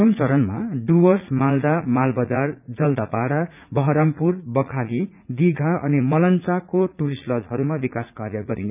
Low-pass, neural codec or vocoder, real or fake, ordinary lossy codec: 3.6 kHz; vocoder, 44.1 kHz, 80 mel bands, Vocos; fake; none